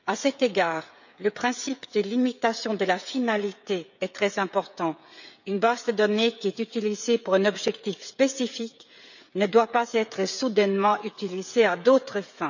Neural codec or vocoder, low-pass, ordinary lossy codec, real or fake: codec, 16 kHz, 16 kbps, FreqCodec, smaller model; 7.2 kHz; none; fake